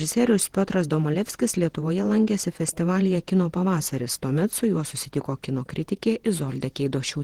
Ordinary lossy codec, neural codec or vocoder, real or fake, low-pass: Opus, 16 kbps; vocoder, 48 kHz, 128 mel bands, Vocos; fake; 19.8 kHz